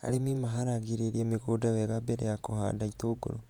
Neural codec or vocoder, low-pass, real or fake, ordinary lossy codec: vocoder, 48 kHz, 128 mel bands, Vocos; 19.8 kHz; fake; none